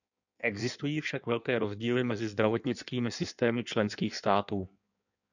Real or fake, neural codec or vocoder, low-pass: fake; codec, 16 kHz in and 24 kHz out, 1.1 kbps, FireRedTTS-2 codec; 7.2 kHz